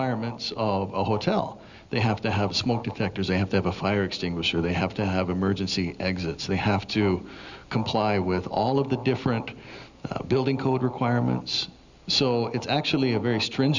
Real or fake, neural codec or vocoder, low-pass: real; none; 7.2 kHz